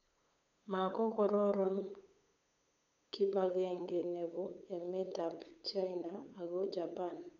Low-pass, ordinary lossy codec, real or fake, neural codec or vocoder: 7.2 kHz; none; fake; codec, 16 kHz, 8 kbps, FunCodec, trained on LibriTTS, 25 frames a second